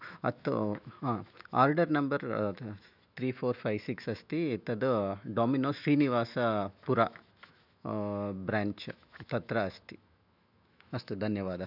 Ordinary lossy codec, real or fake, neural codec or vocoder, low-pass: none; fake; autoencoder, 48 kHz, 128 numbers a frame, DAC-VAE, trained on Japanese speech; 5.4 kHz